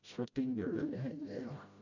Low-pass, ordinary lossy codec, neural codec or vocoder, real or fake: 7.2 kHz; none; codec, 16 kHz, 0.5 kbps, FreqCodec, smaller model; fake